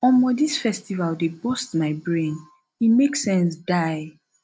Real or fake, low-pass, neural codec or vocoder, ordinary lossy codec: real; none; none; none